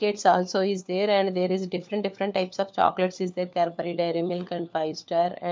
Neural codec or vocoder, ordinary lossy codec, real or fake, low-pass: codec, 16 kHz, 4 kbps, FunCodec, trained on LibriTTS, 50 frames a second; none; fake; none